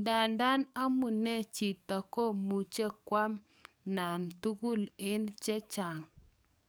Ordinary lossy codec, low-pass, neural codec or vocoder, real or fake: none; none; codec, 44.1 kHz, 7.8 kbps, DAC; fake